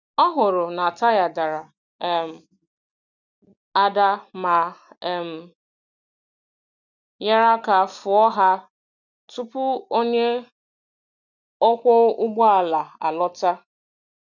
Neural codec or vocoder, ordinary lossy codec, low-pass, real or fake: none; none; 7.2 kHz; real